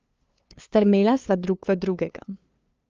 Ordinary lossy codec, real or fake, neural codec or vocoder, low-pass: Opus, 24 kbps; fake; codec, 16 kHz, 2 kbps, FunCodec, trained on LibriTTS, 25 frames a second; 7.2 kHz